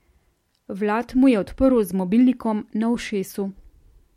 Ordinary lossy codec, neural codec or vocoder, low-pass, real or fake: MP3, 64 kbps; none; 19.8 kHz; real